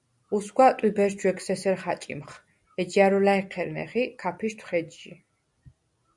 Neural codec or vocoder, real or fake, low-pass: none; real; 10.8 kHz